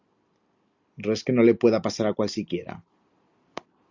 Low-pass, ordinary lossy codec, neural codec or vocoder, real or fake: 7.2 kHz; Opus, 64 kbps; none; real